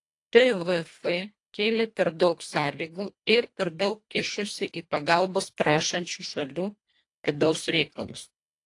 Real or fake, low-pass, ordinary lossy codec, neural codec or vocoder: fake; 10.8 kHz; AAC, 48 kbps; codec, 24 kHz, 1.5 kbps, HILCodec